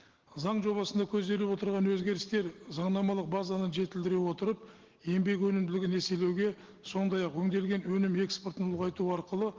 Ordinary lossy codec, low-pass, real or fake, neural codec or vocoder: Opus, 16 kbps; 7.2 kHz; real; none